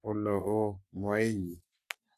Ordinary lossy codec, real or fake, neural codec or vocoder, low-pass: none; fake; codec, 44.1 kHz, 3.4 kbps, Pupu-Codec; 14.4 kHz